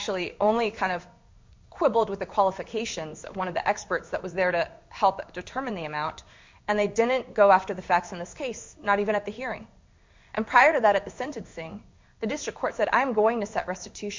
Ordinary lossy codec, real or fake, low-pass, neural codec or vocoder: MP3, 64 kbps; fake; 7.2 kHz; codec, 16 kHz in and 24 kHz out, 1 kbps, XY-Tokenizer